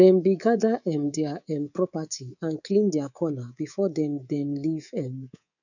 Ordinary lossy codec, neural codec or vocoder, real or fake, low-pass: none; codec, 24 kHz, 3.1 kbps, DualCodec; fake; 7.2 kHz